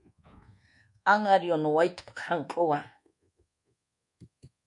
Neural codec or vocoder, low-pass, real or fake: codec, 24 kHz, 1.2 kbps, DualCodec; 10.8 kHz; fake